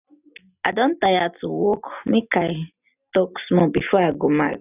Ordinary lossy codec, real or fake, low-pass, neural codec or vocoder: none; real; 3.6 kHz; none